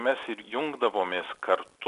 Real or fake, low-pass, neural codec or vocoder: real; 10.8 kHz; none